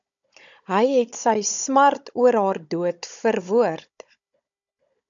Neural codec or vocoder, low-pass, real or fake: codec, 16 kHz, 16 kbps, FunCodec, trained on Chinese and English, 50 frames a second; 7.2 kHz; fake